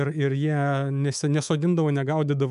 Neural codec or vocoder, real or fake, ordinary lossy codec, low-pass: codec, 24 kHz, 3.1 kbps, DualCodec; fake; MP3, 96 kbps; 10.8 kHz